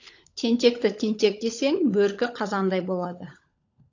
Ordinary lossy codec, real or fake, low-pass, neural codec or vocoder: AAC, 48 kbps; fake; 7.2 kHz; codec, 16 kHz, 16 kbps, FunCodec, trained on LibriTTS, 50 frames a second